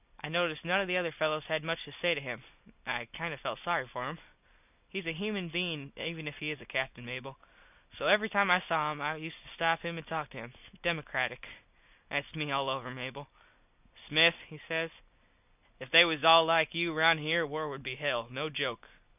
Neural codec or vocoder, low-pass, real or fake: none; 3.6 kHz; real